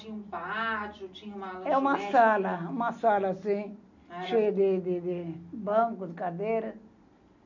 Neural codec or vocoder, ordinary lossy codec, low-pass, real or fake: none; none; 7.2 kHz; real